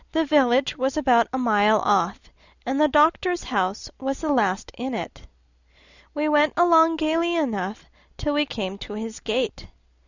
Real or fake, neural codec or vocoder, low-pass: real; none; 7.2 kHz